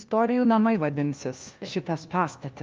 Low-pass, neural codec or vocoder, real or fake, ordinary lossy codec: 7.2 kHz; codec, 16 kHz, 1 kbps, FunCodec, trained on LibriTTS, 50 frames a second; fake; Opus, 24 kbps